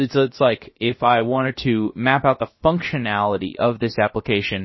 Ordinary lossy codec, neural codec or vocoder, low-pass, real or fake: MP3, 24 kbps; codec, 16 kHz, about 1 kbps, DyCAST, with the encoder's durations; 7.2 kHz; fake